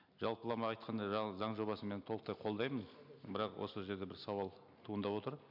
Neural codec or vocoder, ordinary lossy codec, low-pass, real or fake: none; MP3, 48 kbps; 5.4 kHz; real